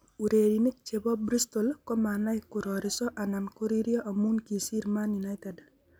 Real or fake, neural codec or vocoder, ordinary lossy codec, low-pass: real; none; none; none